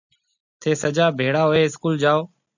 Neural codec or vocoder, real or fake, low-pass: none; real; 7.2 kHz